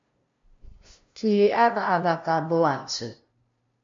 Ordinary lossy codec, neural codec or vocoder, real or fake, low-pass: MP3, 48 kbps; codec, 16 kHz, 0.5 kbps, FunCodec, trained on LibriTTS, 25 frames a second; fake; 7.2 kHz